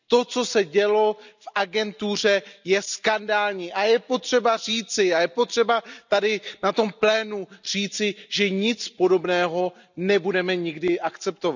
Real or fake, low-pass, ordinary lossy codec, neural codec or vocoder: real; 7.2 kHz; none; none